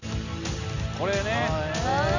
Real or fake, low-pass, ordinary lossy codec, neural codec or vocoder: real; 7.2 kHz; none; none